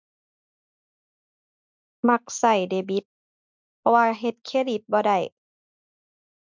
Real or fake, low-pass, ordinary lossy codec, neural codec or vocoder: real; 7.2 kHz; MP3, 64 kbps; none